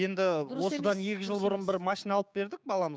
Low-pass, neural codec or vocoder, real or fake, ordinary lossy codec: none; codec, 16 kHz, 6 kbps, DAC; fake; none